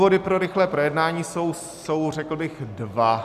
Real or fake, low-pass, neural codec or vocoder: real; 14.4 kHz; none